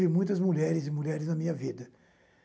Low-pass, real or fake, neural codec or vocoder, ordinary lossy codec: none; real; none; none